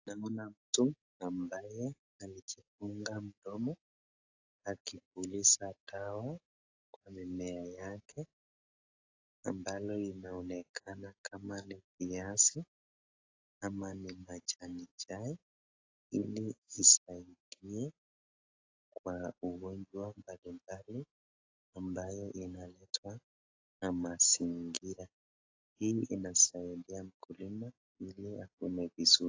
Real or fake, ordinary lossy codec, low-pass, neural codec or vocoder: real; AAC, 32 kbps; 7.2 kHz; none